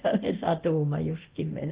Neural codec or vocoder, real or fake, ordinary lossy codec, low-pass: codec, 24 kHz, 0.9 kbps, DualCodec; fake; Opus, 16 kbps; 3.6 kHz